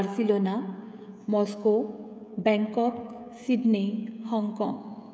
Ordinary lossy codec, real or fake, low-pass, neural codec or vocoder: none; fake; none; codec, 16 kHz, 16 kbps, FreqCodec, smaller model